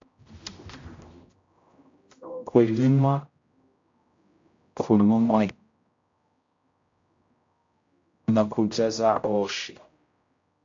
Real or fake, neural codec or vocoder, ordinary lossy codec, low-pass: fake; codec, 16 kHz, 0.5 kbps, X-Codec, HuBERT features, trained on general audio; AAC, 48 kbps; 7.2 kHz